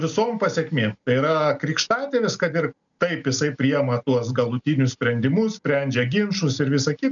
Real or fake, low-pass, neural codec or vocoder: real; 7.2 kHz; none